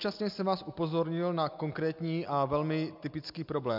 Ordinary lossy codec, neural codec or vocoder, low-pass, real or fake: MP3, 48 kbps; none; 5.4 kHz; real